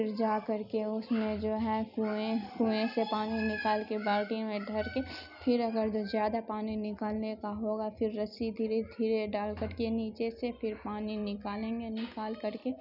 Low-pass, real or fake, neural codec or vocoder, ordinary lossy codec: 5.4 kHz; real; none; none